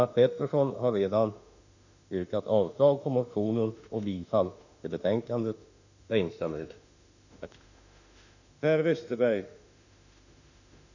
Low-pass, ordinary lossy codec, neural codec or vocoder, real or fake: 7.2 kHz; none; autoencoder, 48 kHz, 32 numbers a frame, DAC-VAE, trained on Japanese speech; fake